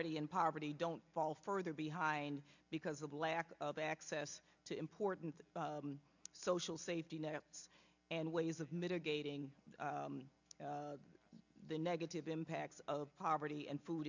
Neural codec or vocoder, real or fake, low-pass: none; real; 7.2 kHz